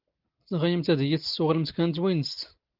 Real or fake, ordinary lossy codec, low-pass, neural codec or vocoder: real; Opus, 32 kbps; 5.4 kHz; none